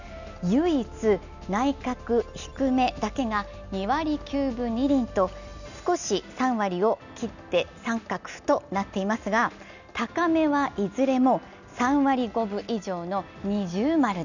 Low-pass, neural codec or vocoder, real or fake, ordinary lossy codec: 7.2 kHz; none; real; none